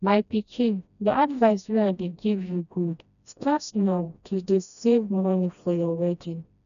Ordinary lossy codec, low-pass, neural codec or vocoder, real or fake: none; 7.2 kHz; codec, 16 kHz, 1 kbps, FreqCodec, smaller model; fake